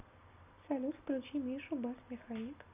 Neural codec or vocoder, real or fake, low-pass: none; real; 3.6 kHz